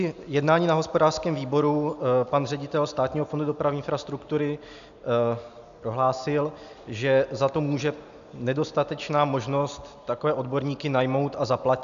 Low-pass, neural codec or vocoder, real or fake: 7.2 kHz; none; real